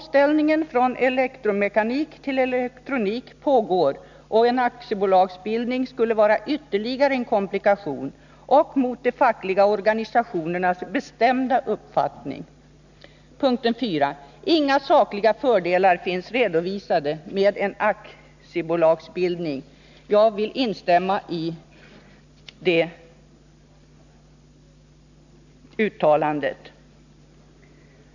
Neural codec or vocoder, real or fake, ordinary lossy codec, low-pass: none; real; none; 7.2 kHz